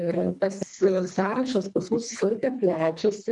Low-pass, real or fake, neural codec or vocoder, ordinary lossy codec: 10.8 kHz; fake; codec, 24 kHz, 1.5 kbps, HILCodec; MP3, 96 kbps